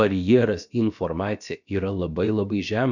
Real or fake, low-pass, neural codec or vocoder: fake; 7.2 kHz; codec, 16 kHz, about 1 kbps, DyCAST, with the encoder's durations